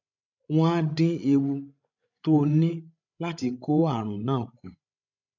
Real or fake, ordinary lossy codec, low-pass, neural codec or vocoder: fake; none; 7.2 kHz; codec, 16 kHz, 16 kbps, FreqCodec, larger model